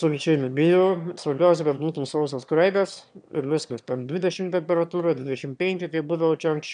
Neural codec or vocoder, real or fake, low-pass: autoencoder, 22.05 kHz, a latent of 192 numbers a frame, VITS, trained on one speaker; fake; 9.9 kHz